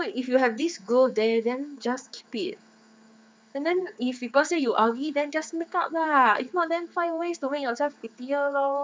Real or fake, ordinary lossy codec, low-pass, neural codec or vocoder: fake; none; none; codec, 16 kHz, 4 kbps, X-Codec, HuBERT features, trained on general audio